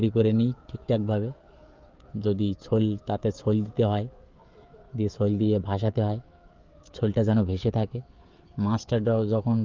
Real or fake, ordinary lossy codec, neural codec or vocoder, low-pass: fake; Opus, 32 kbps; codec, 16 kHz, 16 kbps, FreqCodec, smaller model; 7.2 kHz